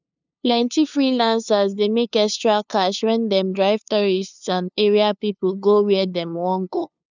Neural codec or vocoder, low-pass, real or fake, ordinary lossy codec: codec, 16 kHz, 2 kbps, FunCodec, trained on LibriTTS, 25 frames a second; 7.2 kHz; fake; none